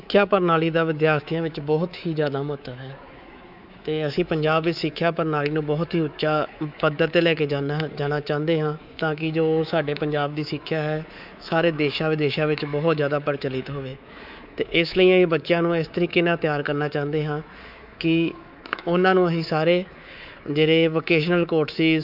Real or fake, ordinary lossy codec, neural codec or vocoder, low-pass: fake; none; codec, 24 kHz, 3.1 kbps, DualCodec; 5.4 kHz